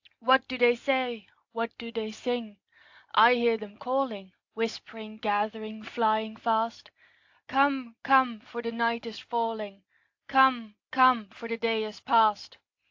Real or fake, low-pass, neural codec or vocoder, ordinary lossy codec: real; 7.2 kHz; none; AAC, 48 kbps